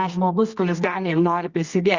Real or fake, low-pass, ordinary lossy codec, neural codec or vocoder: fake; 7.2 kHz; Opus, 64 kbps; codec, 24 kHz, 0.9 kbps, WavTokenizer, medium music audio release